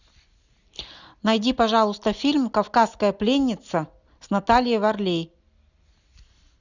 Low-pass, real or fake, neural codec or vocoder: 7.2 kHz; real; none